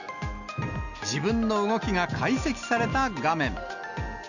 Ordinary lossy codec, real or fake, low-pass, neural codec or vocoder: none; real; 7.2 kHz; none